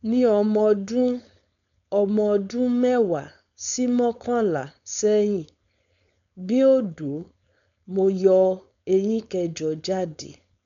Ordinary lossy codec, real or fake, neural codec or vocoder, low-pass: none; fake; codec, 16 kHz, 4.8 kbps, FACodec; 7.2 kHz